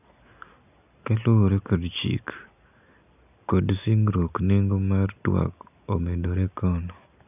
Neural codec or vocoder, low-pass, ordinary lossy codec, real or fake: none; 3.6 kHz; none; real